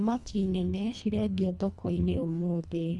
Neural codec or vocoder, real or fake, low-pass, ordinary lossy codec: codec, 24 kHz, 1.5 kbps, HILCodec; fake; none; none